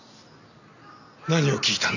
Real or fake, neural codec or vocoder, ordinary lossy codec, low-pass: real; none; none; 7.2 kHz